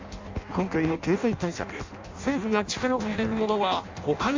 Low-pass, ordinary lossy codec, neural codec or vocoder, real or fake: 7.2 kHz; MP3, 48 kbps; codec, 16 kHz in and 24 kHz out, 0.6 kbps, FireRedTTS-2 codec; fake